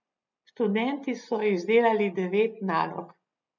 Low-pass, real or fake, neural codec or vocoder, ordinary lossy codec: 7.2 kHz; real; none; none